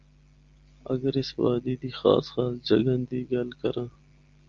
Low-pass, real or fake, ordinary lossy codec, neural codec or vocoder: 7.2 kHz; real; Opus, 32 kbps; none